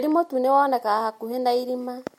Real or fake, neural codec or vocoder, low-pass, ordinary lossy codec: real; none; 19.8 kHz; MP3, 64 kbps